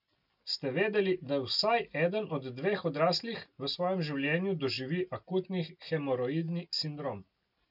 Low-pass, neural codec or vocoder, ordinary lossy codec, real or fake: 5.4 kHz; none; none; real